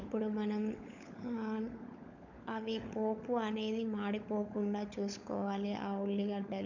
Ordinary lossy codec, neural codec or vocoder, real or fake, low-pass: none; codec, 16 kHz, 16 kbps, FunCodec, trained on LibriTTS, 50 frames a second; fake; 7.2 kHz